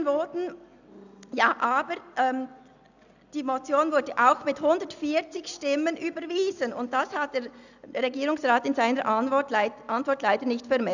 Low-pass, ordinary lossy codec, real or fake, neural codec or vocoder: 7.2 kHz; none; real; none